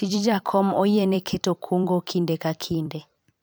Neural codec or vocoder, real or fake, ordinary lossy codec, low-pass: vocoder, 44.1 kHz, 128 mel bands every 256 samples, BigVGAN v2; fake; none; none